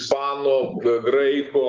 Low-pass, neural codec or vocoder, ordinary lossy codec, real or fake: 7.2 kHz; none; Opus, 32 kbps; real